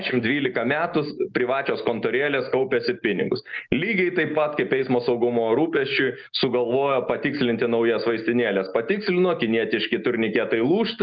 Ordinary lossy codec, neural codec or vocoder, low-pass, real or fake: Opus, 24 kbps; none; 7.2 kHz; real